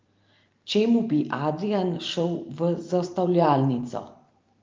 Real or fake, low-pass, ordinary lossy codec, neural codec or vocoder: fake; 7.2 kHz; Opus, 32 kbps; vocoder, 44.1 kHz, 128 mel bands every 512 samples, BigVGAN v2